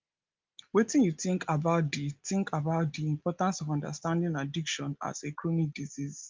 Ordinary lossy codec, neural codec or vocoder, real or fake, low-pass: Opus, 32 kbps; none; real; 7.2 kHz